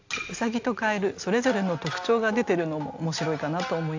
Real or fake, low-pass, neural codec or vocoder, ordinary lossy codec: real; 7.2 kHz; none; AAC, 48 kbps